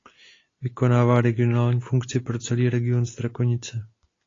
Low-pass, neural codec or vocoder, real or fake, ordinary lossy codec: 7.2 kHz; none; real; AAC, 32 kbps